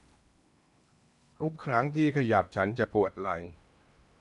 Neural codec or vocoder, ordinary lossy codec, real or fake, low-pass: codec, 16 kHz in and 24 kHz out, 0.8 kbps, FocalCodec, streaming, 65536 codes; none; fake; 10.8 kHz